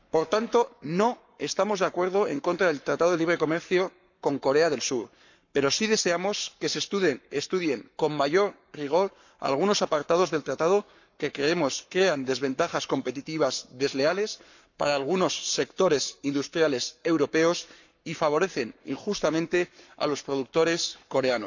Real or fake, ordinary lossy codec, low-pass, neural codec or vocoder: fake; none; 7.2 kHz; codec, 44.1 kHz, 7.8 kbps, Pupu-Codec